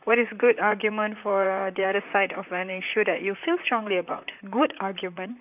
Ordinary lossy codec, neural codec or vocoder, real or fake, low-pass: none; vocoder, 44.1 kHz, 128 mel bands, Pupu-Vocoder; fake; 3.6 kHz